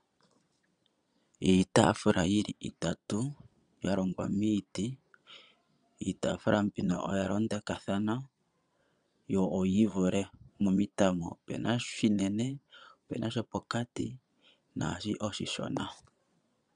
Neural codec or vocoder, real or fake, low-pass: vocoder, 22.05 kHz, 80 mel bands, Vocos; fake; 9.9 kHz